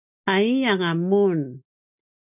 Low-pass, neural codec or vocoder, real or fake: 3.6 kHz; none; real